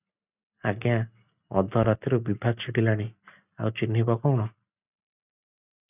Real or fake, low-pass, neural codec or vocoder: real; 3.6 kHz; none